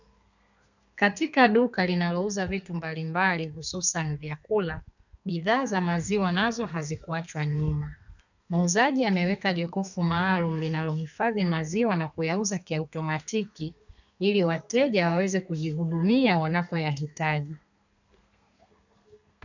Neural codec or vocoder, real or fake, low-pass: codec, 32 kHz, 1.9 kbps, SNAC; fake; 7.2 kHz